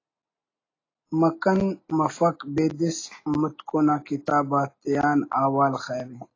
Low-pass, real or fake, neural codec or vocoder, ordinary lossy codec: 7.2 kHz; real; none; AAC, 32 kbps